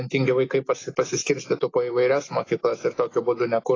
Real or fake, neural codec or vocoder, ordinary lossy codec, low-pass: real; none; AAC, 32 kbps; 7.2 kHz